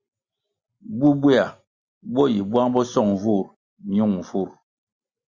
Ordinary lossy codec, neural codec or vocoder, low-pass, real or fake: Opus, 64 kbps; none; 7.2 kHz; real